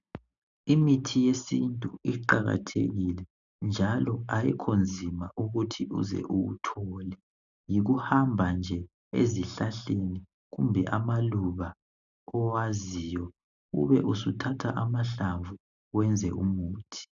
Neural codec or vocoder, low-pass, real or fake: none; 7.2 kHz; real